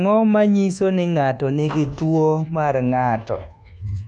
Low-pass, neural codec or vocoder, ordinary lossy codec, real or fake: none; codec, 24 kHz, 1.2 kbps, DualCodec; none; fake